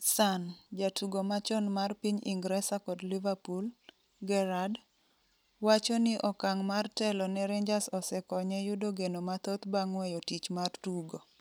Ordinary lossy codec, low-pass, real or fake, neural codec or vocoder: none; none; real; none